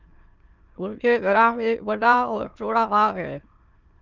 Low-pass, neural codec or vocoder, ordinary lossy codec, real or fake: 7.2 kHz; autoencoder, 22.05 kHz, a latent of 192 numbers a frame, VITS, trained on many speakers; Opus, 24 kbps; fake